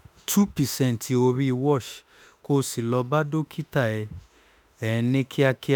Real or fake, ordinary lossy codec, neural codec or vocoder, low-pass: fake; none; autoencoder, 48 kHz, 32 numbers a frame, DAC-VAE, trained on Japanese speech; none